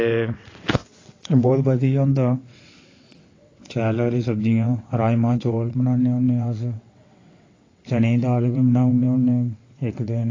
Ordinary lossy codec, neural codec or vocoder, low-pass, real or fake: AAC, 32 kbps; vocoder, 22.05 kHz, 80 mel bands, WaveNeXt; 7.2 kHz; fake